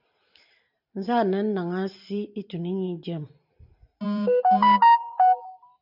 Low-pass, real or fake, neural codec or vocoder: 5.4 kHz; fake; vocoder, 44.1 kHz, 128 mel bands every 512 samples, BigVGAN v2